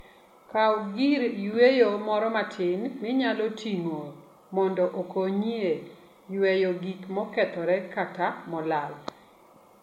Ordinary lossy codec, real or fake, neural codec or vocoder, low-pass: MP3, 64 kbps; real; none; 19.8 kHz